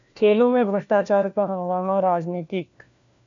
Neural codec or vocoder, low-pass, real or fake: codec, 16 kHz, 1 kbps, FunCodec, trained on LibriTTS, 50 frames a second; 7.2 kHz; fake